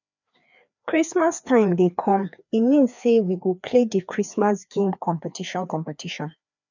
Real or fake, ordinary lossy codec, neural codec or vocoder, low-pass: fake; none; codec, 16 kHz, 2 kbps, FreqCodec, larger model; 7.2 kHz